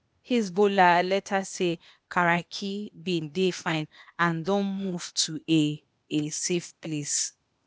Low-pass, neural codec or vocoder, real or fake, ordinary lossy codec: none; codec, 16 kHz, 0.8 kbps, ZipCodec; fake; none